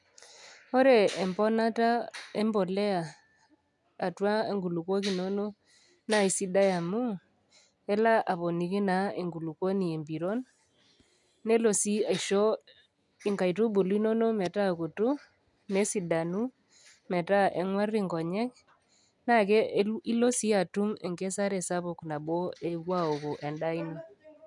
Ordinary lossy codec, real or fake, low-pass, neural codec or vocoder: none; real; 10.8 kHz; none